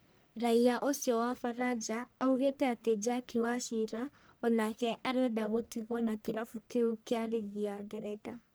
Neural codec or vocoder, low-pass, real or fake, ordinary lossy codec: codec, 44.1 kHz, 1.7 kbps, Pupu-Codec; none; fake; none